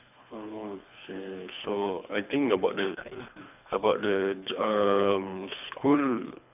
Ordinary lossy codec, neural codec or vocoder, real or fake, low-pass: none; codec, 24 kHz, 3 kbps, HILCodec; fake; 3.6 kHz